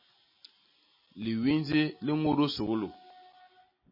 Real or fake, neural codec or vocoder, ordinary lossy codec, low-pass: real; none; MP3, 24 kbps; 5.4 kHz